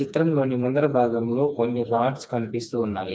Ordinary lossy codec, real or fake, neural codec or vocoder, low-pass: none; fake; codec, 16 kHz, 2 kbps, FreqCodec, smaller model; none